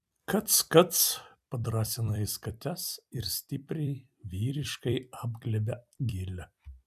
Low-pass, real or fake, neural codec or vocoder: 14.4 kHz; fake; vocoder, 44.1 kHz, 128 mel bands every 256 samples, BigVGAN v2